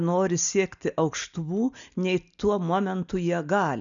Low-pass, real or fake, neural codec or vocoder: 7.2 kHz; real; none